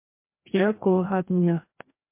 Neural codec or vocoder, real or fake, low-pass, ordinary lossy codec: codec, 16 kHz, 1 kbps, FreqCodec, larger model; fake; 3.6 kHz; MP3, 24 kbps